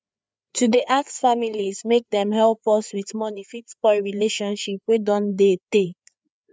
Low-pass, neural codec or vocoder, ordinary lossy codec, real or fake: none; codec, 16 kHz, 4 kbps, FreqCodec, larger model; none; fake